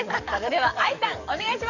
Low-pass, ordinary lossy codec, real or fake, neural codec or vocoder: 7.2 kHz; none; fake; codec, 44.1 kHz, 7.8 kbps, DAC